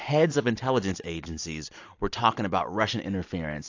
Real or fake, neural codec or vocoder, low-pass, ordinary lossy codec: real; none; 7.2 kHz; AAC, 48 kbps